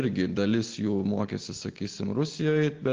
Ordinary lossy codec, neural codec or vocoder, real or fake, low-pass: Opus, 16 kbps; none; real; 7.2 kHz